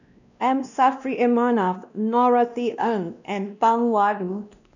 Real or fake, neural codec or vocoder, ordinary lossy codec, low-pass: fake; codec, 16 kHz, 1 kbps, X-Codec, WavLM features, trained on Multilingual LibriSpeech; none; 7.2 kHz